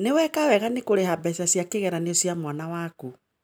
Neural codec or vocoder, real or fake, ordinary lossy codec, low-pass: none; real; none; none